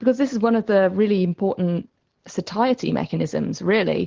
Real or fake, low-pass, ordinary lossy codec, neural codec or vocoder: real; 7.2 kHz; Opus, 16 kbps; none